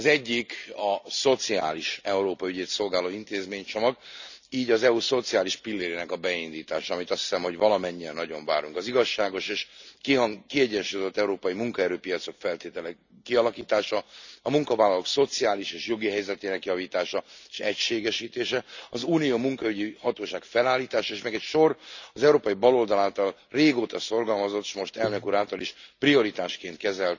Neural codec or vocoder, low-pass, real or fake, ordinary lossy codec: none; 7.2 kHz; real; none